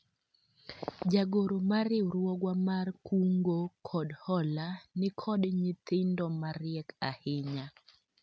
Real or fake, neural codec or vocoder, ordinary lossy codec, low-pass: real; none; none; none